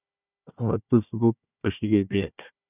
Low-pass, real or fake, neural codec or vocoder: 3.6 kHz; fake; codec, 16 kHz, 1 kbps, FunCodec, trained on Chinese and English, 50 frames a second